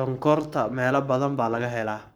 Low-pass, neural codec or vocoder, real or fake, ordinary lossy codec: none; codec, 44.1 kHz, 7.8 kbps, Pupu-Codec; fake; none